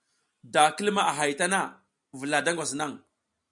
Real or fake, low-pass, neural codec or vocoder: real; 10.8 kHz; none